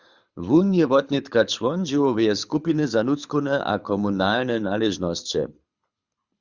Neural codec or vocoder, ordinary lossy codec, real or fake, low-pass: codec, 24 kHz, 6 kbps, HILCodec; Opus, 64 kbps; fake; 7.2 kHz